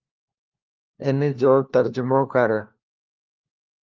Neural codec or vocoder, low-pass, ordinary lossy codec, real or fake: codec, 16 kHz, 1 kbps, FunCodec, trained on LibriTTS, 50 frames a second; 7.2 kHz; Opus, 24 kbps; fake